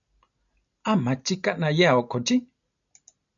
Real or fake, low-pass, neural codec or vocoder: real; 7.2 kHz; none